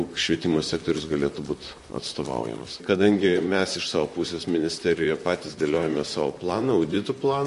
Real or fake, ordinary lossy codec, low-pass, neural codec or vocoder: fake; MP3, 48 kbps; 14.4 kHz; vocoder, 44.1 kHz, 128 mel bands, Pupu-Vocoder